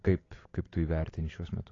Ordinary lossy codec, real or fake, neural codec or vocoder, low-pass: AAC, 32 kbps; real; none; 7.2 kHz